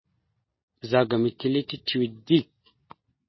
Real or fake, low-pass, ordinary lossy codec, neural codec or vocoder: real; 7.2 kHz; MP3, 24 kbps; none